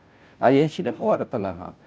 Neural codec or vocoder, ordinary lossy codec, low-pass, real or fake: codec, 16 kHz, 0.5 kbps, FunCodec, trained on Chinese and English, 25 frames a second; none; none; fake